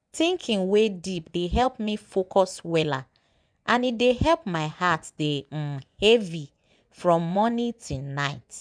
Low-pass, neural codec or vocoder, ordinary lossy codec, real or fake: 9.9 kHz; vocoder, 24 kHz, 100 mel bands, Vocos; none; fake